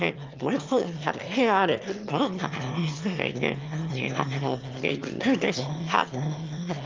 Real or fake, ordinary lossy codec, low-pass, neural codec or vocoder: fake; Opus, 24 kbps; 7.2 kHz; autoencoder, 22.05 kHz, a latent of 192 numbers a frame, VITS, trained on one speaker